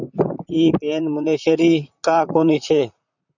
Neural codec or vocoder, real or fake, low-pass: vocoder, 44.1 kHz, 128 mel bands, Pupu-Vocoder; fake; 7.2 kHz